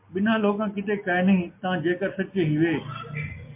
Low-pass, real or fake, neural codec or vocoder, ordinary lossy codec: 3.6 kHz; real; none; MP3, 32 kbps